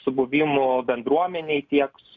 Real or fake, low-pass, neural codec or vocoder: real; 7.2 kHz; none